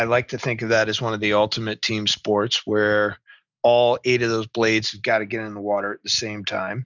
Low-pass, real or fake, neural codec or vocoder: 7.2 kHz; real; none